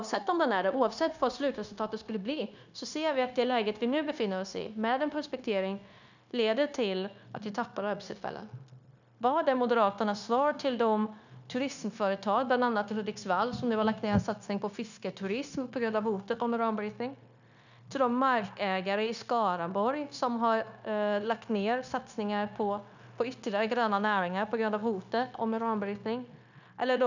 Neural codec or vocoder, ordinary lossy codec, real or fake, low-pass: codec, 16 kHz, 0.9 kbps, LongCat-Audio-Codec; none; fake; 7.2 kHz